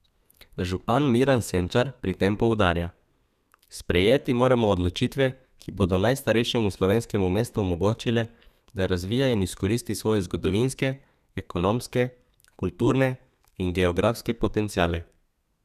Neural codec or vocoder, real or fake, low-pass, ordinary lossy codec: codec, 32 kHz, 1.9 kbps, SNAC; fake; 14.4 kHz; none